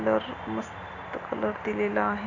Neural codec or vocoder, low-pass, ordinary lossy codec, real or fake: none; 7.2 kHz; none; real